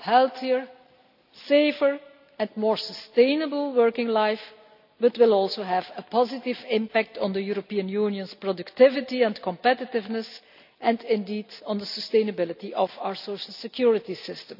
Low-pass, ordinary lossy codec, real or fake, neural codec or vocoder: 5.4 kHz; none; real; none